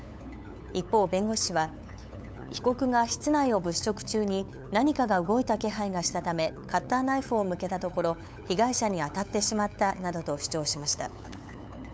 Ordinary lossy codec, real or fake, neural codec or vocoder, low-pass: none; fake; codec, 16 kHz, 8 kbps, FunCodec, trained on LibriTTS, 25 frames a second; none